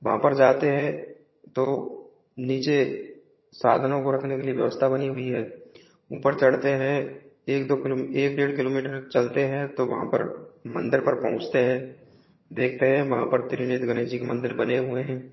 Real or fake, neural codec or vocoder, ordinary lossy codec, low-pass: fake; vocoder, 22.05 kHz, 80 mel bands, HiFi-GAN; MP3, 24 kbps; 7.2 kHz